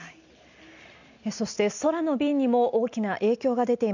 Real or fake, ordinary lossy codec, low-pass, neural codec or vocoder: real; none; 7.2 kHz; none